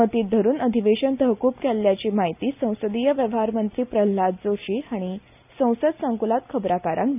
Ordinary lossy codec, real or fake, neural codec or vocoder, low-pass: none; real; none; 3.6 kHz